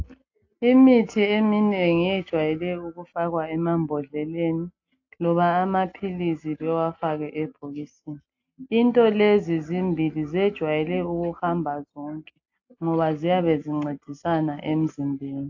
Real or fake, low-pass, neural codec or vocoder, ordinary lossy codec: real; 7.2 kHz; none; AAC, 48 kbps